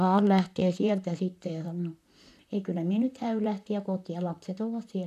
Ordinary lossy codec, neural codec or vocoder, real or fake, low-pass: none; vocoder, 44.1 kHz, 128 mel bands, Pupu-Vocoder; fake; 14.4 kHz